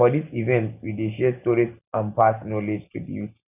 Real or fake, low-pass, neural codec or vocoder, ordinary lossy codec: real; 3.6 kHz; none; none